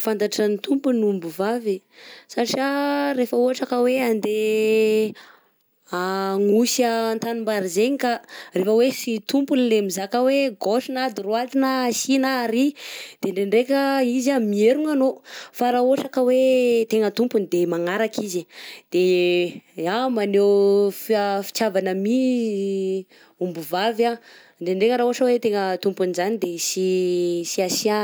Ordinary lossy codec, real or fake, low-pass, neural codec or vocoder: none; real; none; none